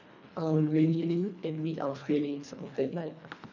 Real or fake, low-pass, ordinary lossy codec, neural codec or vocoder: fake; 7.2 kHz; none; codec, 24 kHz, 1.5 kbps, HILCodec